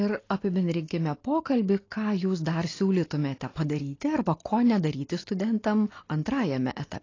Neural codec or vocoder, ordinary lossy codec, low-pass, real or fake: none; AAC, 32 kbps; 7.2 kHz; real